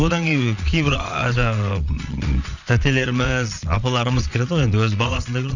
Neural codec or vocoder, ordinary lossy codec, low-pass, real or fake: vocoder, 22.05 kHz, 80 mel bands, Vocos; none; 7.2 kHz; fake